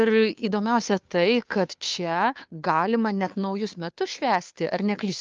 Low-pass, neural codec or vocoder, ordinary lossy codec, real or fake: 7.2 kHz; codec, 16 kHz, 2 kbps, FunCodec, trained on Chinese and English, 25 frames a second; Opus, 24 kbps; fake